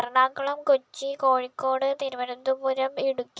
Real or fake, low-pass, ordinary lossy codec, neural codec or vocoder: real; none; none; none